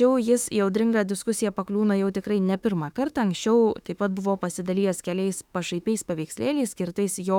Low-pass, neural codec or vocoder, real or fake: 19.8 kHz; autoencoder, 48 kHz, 32 numbers a frame, DAC-VAE, trained on Japanese speech; fake